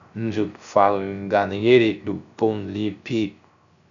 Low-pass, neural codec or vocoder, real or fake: 7.2 kHz; codec, 16 kHz, 0.3 kbps, FocalCodec; fake